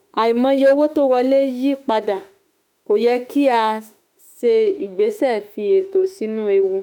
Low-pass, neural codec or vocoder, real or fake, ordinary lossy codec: 19.8 kHz; autoencoder, 48 kHz, 32 numbers a frame, DAC-VAE, trained on Japanese speech; fake; none